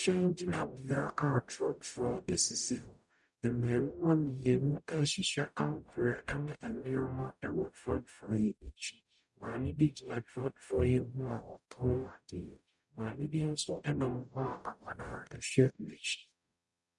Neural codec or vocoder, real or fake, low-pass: codec, 44.1 kHz, 0.9 kbps, DAC; fake; 10.8 kHz